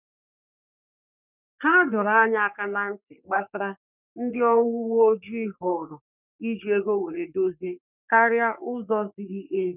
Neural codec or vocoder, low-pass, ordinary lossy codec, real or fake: codec, 44.1 kHz, 3.4 kbps, Pupu-Codec; 3.6 kHz; none; fake